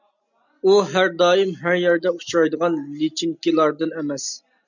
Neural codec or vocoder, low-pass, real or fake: none; 7.2 kHz; real